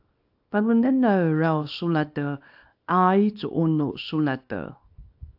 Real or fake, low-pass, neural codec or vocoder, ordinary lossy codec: fake; 5.4 kHz; codec, 24 kHz, 0.9 kbps, WavTokenizer, small release; AAC, 48 kbps